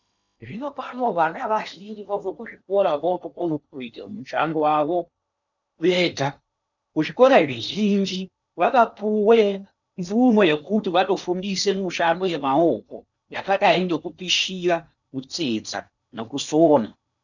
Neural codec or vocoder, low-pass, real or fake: codec, 16 kHz in and 24 kHz out, 0.8 kbps, FocalCodec, streaming, 65536 codes; 7.2 kHz; fake